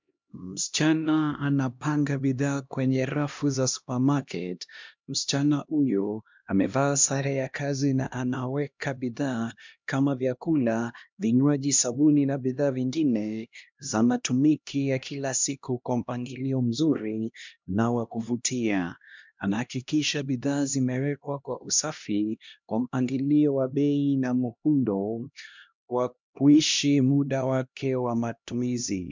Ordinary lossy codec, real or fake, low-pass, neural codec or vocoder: MP3, 64 kbps; fake; 7.2 kHz; codec, 16 kHz, 1 kbps, X-Codec, HuBERT features, trained on LibriSpeech